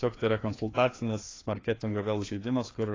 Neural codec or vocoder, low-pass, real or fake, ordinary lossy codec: codec, 16 kHz, 2 kbps, FreqCodec, larger model; 7.2 kHz; fake; AAC, 32 kbps